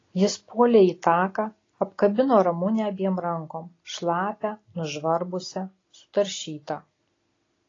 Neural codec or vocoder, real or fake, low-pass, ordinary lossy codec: none; real; 7.2 kHz; AAC, 32 kbps